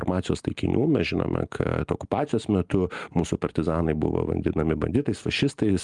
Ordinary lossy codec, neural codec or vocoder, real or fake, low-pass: Opus, 32 kbps; none; real; 10.8 kHz